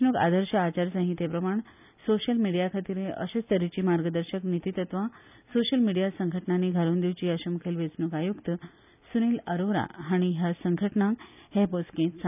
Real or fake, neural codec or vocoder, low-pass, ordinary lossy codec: real; none; 3.6 kHz; none